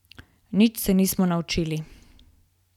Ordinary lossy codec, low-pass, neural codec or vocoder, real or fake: none; 19.8 kHz; none; real